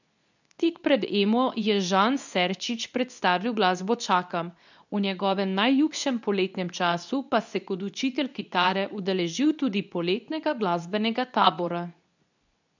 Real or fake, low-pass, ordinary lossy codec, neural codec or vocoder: fake; 7.2 kHz; none; codec, 24 kHz, 0.9 kbps, WavTokenizer, medium speech release version 2